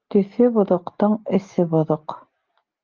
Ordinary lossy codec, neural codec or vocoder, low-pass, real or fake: Opus, 24 kbps; none; 7.2 kHz; real